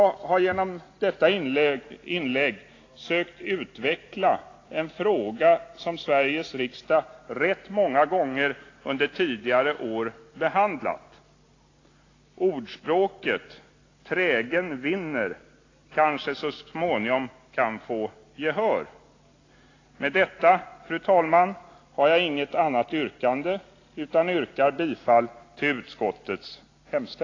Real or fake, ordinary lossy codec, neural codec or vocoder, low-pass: real; AAC, 32 kbps; none; 7.2 kHz